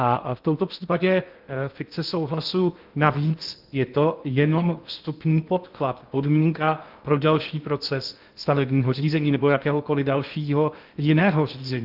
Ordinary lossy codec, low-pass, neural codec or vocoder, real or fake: Opus, 32 kbps; 5.4 kHz; codec, 16 kHz in and 24 kHz out, 0.6 kbps, FocalCodec, streaming, 2048 codes; fake